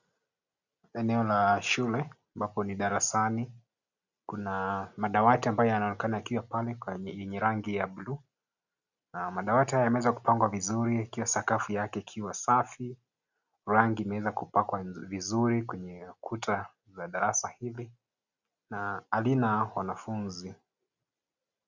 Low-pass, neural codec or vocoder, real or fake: 7.2 kHz; none; real